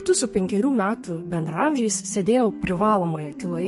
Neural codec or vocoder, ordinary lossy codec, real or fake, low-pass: codec, 32 kHz, 1.9 kbps, SNAC; MP3, 48 kbps; fake; 14.4 kHz